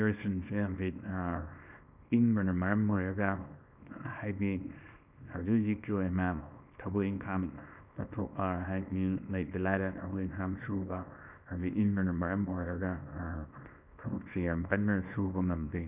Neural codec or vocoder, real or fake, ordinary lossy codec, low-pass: codec, 24 kHz, 0.9 kbps, WavTokenizer, small release; fake; none; 3.6 kHz